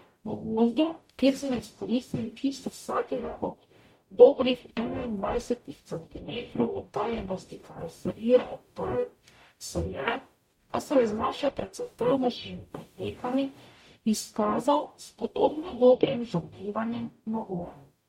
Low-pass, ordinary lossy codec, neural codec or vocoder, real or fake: 19.8 kHz; MP3, 64 kbps; codec, 44.1 kHz, 0.9 kbps, DAC; fake